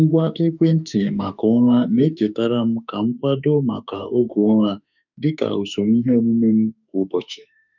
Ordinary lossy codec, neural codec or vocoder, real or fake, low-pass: none; autoencoder, 48 kHz, 32 numbers a frame, DAC-VAE, trained on Japanese speech; fake; 7.2 kHz